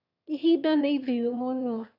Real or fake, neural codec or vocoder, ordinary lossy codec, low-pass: fake; autoencoder, 22.05 kHz, a latent of 192 numbers a frame, VITS, trained on one speaker; none; 5.4 kHz